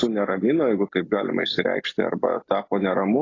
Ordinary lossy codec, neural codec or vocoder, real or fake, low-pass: AAC, 32 kbps; none; real; 7.2 kHz